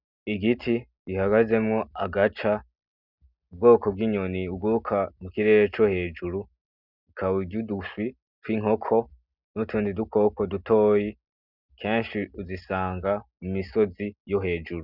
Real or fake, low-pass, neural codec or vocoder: real; 5.4 kHz; none